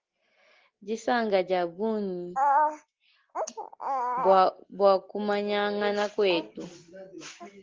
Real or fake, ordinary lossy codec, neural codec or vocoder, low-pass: real; Opus, 16 kbps; none; 7.2 kHz